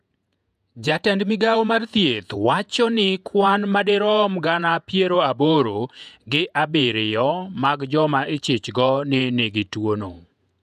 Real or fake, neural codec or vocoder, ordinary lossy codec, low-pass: fake; vocoder, 48 kHz, 128 mel bands, Vocos; none; 14.4 kHz